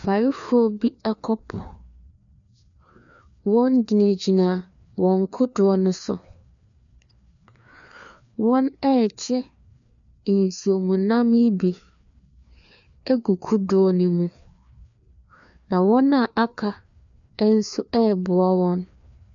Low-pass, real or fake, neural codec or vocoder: 7.2 kHz; fake; codec, 16 kHz, 2 kbps, FreqCodec, larger model